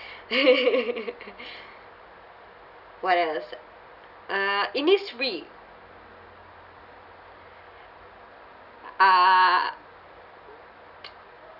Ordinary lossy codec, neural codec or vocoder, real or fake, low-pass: none; none; real; 5.4 kHz